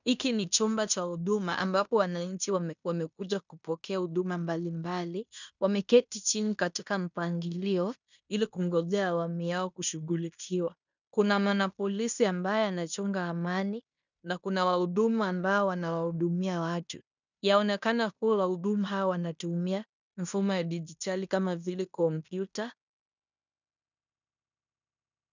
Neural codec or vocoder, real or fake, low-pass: codec, 16 kHz in and 24 kHz out, 0.9 kbps, LongCat-Audio-Codec, fine tuned four codebook decoder; fake; 7.2 kHz